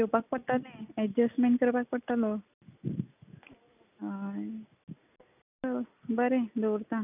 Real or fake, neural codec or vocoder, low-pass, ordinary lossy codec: real; none; 3.6 kHz; none